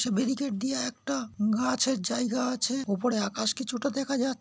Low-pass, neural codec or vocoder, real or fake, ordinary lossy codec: none; none; real; none